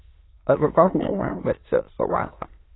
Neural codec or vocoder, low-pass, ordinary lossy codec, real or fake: autoencoder, 22.05 kHz, a latent of 192 numbers a frame, VITS, trained on many speakers; 7.2 kHz; AAC, 16 kbps; fake